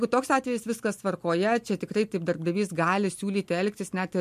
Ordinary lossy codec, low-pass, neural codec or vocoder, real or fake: MP3, 64 kbps; 14.4 kHz; none; real